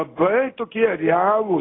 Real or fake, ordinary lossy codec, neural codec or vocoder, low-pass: real; AAC, 16 kbps; none; 7.2 kHz